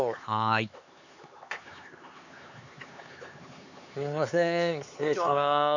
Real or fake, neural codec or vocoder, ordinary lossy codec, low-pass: fake; codec, 16 kHz, 4 kbps, X-Codec, HuBERT features, trained on LibriSpeech; none; 7.2 kHz